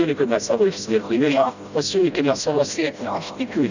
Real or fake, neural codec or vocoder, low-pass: fake; codec, 16 kHz, 0.5 kbps, FreqCodec, smaller model; 7.2 kHz